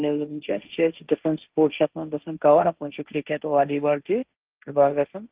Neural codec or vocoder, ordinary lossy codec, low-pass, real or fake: codec, 16 kHz, 1.1 kbps, Voila-Tokenizer; Opus, 24 kbps; 3.6 kHz; fake